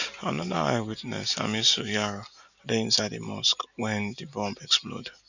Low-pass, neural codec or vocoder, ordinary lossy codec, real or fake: 7.2 kHz; none; none; real